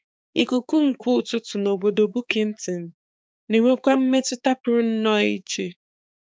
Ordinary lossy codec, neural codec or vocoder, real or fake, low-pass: none; codec, 16 kHz, 4 kbps, X-Codec, HuBERT features, trained on balanced general audio; fake; none